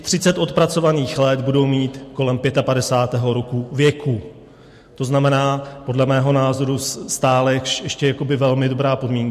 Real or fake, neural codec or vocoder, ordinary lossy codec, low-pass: real; none; MP3, 64 kbps; 14.4 kHz